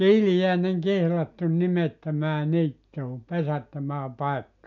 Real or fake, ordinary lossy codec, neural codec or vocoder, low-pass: real; none; none; 7.2 kHz